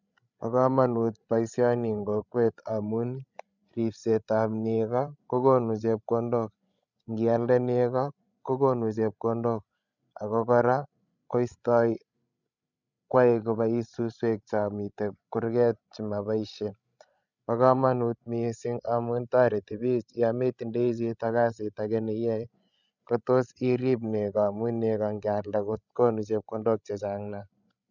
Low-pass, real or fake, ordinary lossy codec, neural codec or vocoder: 7.2 kHz; fake; none; codec, 16 kHz, 16 kbps, FreqCodec, larger model